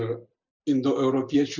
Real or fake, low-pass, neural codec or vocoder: real; 7.2 kHz; none